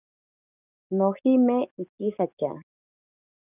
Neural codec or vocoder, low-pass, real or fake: codec, 44.1 kHz, 7.8 kbps, DAC; 3.6 kHz; fake